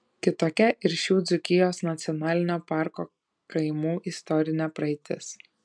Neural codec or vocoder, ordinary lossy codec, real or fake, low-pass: none; MP3, 96 kbps; real; 9.9 kHz